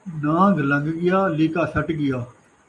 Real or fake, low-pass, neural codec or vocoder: real; 10.8 kHz; none